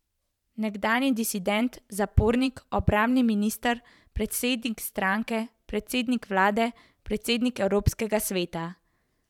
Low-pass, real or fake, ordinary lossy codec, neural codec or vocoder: 19.8 kHz; fake; none; vocoder, 44.1 kHz, 128 mel bands every 512 samples, BigVGAN v2